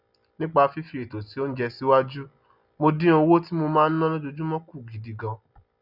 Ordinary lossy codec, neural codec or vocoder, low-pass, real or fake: none; none; 5.4 kHz; real